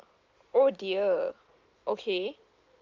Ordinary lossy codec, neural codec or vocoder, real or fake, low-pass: Opus, 32 kbps; none; real; 7.2 kHz